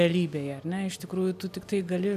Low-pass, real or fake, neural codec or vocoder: 14.4 kHz; real; none